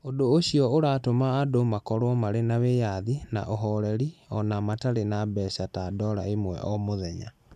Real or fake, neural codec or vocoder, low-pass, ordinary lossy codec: real; none; 14.4 kHz; none